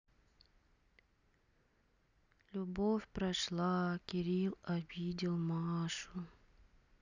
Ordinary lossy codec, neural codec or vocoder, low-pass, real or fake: none; none; 7.2 kHz; real